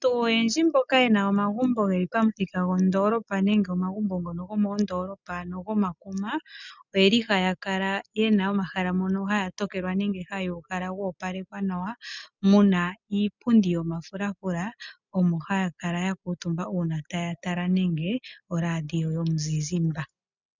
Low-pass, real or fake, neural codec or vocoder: 7.2 kHz; real; none